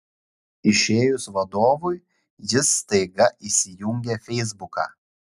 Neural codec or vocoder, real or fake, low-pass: none; real; 14.4 kHz